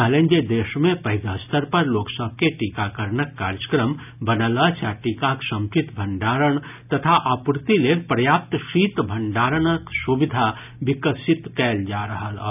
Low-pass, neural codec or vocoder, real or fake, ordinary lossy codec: 3.6 kHz; none; real; none